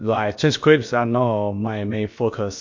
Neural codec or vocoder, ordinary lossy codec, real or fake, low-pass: codec, 16 kHz, about 1 kbps, DyCAST, with the encoder's durations; MP3, 48 kbps; fake; 7.2 kHz